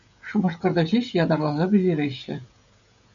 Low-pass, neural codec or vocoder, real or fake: 7.2 kHz; codec, 16 kHz, 16 kbps, FreqCodec, smaller model; fake